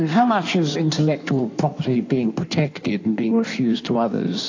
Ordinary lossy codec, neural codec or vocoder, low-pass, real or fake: AAC, 48 kbps; codec, 16 kHz in and 24 kHz out, 1.1 kbps, FireRedTTS-2 codec; 7.2 kHz; fake